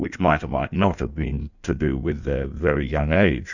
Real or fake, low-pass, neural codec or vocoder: fake; 7.2 kHz; codec, 16 kHz in and 24 kHz out, 1.1 kbps, FireRedTTS-2 codec